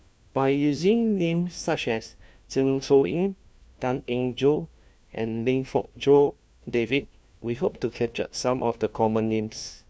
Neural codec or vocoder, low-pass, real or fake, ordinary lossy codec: codec, 16 kHz, 1 kbps, FunCodec, trained on LibriTTS, 50 frames a second; none; fake; none